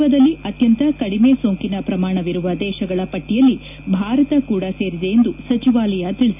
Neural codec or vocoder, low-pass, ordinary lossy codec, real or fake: none; 3.6 kHz; none; real